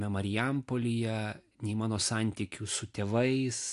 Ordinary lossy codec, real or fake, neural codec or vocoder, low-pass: AAC, 64 kbps; real; none; 10.8 kHz